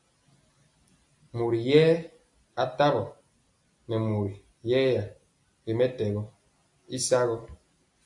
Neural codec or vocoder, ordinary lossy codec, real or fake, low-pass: none; AAC, 64 kbps; real; 10.8 kHz